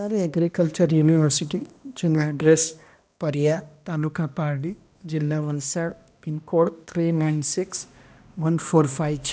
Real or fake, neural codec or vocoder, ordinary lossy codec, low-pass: fake; codec, 16 kHz, 1 kbps, X-Codec, HuBERT features, trained on balanced general audio; none; none